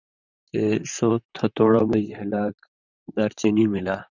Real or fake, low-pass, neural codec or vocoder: fake; 7.2 kHz; vocoder, 44.1 kHz, 128 mel bands, Pupu-Vocoder